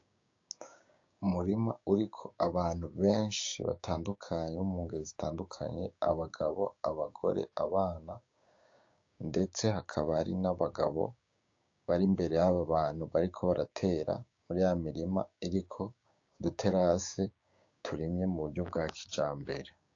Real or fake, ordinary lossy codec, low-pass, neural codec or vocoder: fake; AAC, 48 kbps; 7.2 kHz; codec, 16 kHz, 6 kbps, DAC